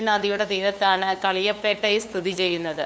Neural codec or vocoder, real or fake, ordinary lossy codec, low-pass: codec, 16 kHz, 2 kbps, FunCodec, trained on LibriTTS, 25 frames a second; fake; none; none